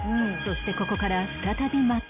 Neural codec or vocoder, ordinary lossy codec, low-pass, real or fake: none; none; 3.6 kHz; real